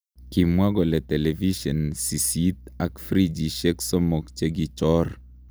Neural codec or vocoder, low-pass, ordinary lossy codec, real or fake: none; none; none; real